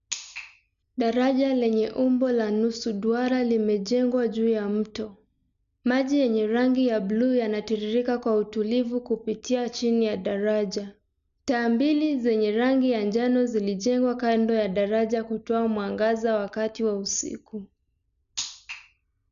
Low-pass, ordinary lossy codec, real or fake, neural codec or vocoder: 7.2 kHz; none; real; none